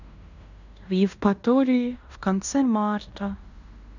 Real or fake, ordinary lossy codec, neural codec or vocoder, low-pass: fake; none; codec, 16 kHz in and 24 kHz out, 0.9 kbps, LongCat-Audio-Codec, fine tuned four codebook decoder; 7.2 kHz